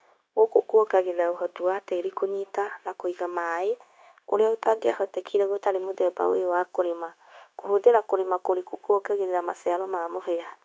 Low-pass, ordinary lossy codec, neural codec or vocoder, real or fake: none; none; codec, 16 kHz, 0.9 kbps, LongCat-Audio-Codec; fake